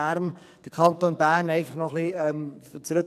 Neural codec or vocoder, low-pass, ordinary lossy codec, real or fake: codec, 44.1 kHz, 2.6 kbps, SNAC; 14.4 kHz; none; fake